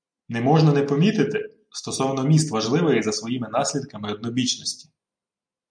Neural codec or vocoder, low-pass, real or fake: none; 9.9 kHz; real